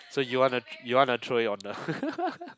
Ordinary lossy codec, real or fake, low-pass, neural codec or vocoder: none; real; none; none